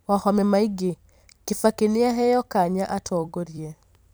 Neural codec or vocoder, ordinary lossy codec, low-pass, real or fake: vocoder, 44.1 kHz, 128 mel bands every 256 samples, BigVGAN v2; none; none; fake